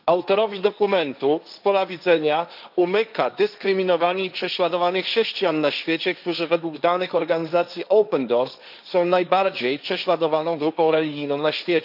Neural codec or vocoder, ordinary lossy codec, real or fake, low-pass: codec, 16 kHz, 1.1 kbps, Voila-Tokenizer; none; fake; 5.4 kHz